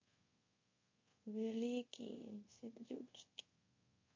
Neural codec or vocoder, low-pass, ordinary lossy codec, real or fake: codec, 24 kHz, 0.5 kbps, DualCodec; 7.2 kHz; MP3, 32 kbps; fake